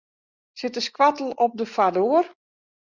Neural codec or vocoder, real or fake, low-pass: none; real; 7.2 kHz